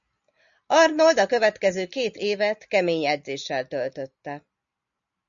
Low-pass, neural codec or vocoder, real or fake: 7.2 kHz; none; real